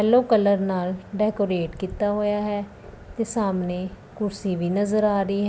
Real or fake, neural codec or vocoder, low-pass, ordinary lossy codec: real; none; none; none